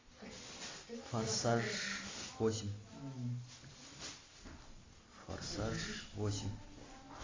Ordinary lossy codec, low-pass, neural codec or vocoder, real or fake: AAC, 32 kbps; 7.2 kHz; none; real